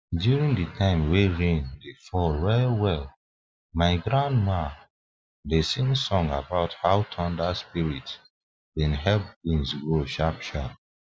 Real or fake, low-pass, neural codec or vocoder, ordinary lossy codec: real; none; none; none